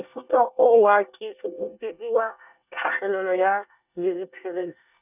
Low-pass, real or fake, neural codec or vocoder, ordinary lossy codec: 3.6 kHz; fake; codec, 24 kHz, 1 kbps, SNAC; none